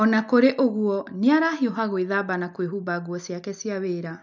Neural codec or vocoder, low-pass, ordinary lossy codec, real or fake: none; 7.2 kHz; none; real